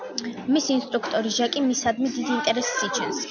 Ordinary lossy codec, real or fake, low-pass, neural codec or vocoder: MP3, 64 kbps; real; 7.2 kHz; none